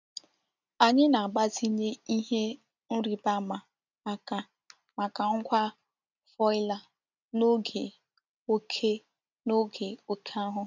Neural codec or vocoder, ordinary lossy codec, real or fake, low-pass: none; none; real; 7.2 kHz